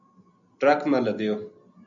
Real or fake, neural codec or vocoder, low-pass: real; none; 7.2 kHz